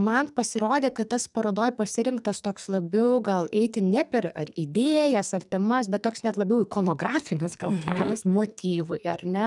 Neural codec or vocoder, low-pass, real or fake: codec, 44.1 kHz, 2.6 kbps, SNAC; 10.8 kHz; fake